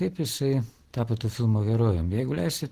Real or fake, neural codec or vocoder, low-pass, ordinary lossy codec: real; none; 14.4 kHz; Opus, 16 kbps